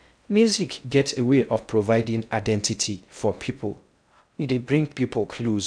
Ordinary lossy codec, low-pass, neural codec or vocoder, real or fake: none; 9.9 kHz; codec, 16 kHz in and 24 kHz out, 0.6 kbps, FocalCodec, streaming, 2048 codes; fake